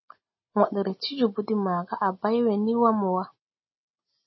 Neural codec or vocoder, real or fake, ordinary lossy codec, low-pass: none; real; MP3, 24 kbps; 7.2 kHz